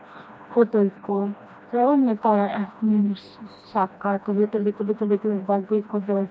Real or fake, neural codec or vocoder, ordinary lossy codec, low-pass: fake; codec, 16 kHz, 1 kbps, FreqCodec, smaller model; none; none